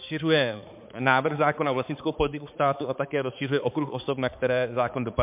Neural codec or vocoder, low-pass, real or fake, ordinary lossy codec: codec, 16 kHz, 4 kbps, X-Codec, HuBERT features, trained on balanced general audio; 3.6 kHz; fake; MP3, 32 kbps